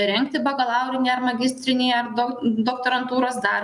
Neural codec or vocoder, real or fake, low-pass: none; real; 10.8 kHz